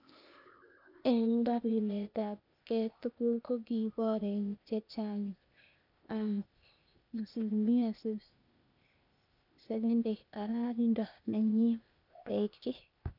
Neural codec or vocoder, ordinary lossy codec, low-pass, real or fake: codec, 16 kHz, 0.8 kbps, ZipCodec; none; 5.4 kHz; fake